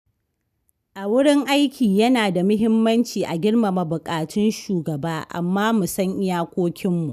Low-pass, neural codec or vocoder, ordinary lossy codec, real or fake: 14.4 kHz; none; none; real